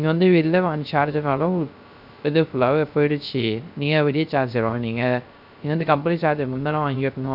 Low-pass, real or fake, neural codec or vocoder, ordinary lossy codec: 5.4 kHz; fake; codec, 16 kHz, 0.3 kbps, FocalCodec; none